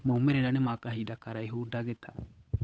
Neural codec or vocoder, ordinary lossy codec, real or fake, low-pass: codec, 16 kHz, 8 kbps, FunCodec, trained on Chinese and English, 25 frames a second; none; fake; none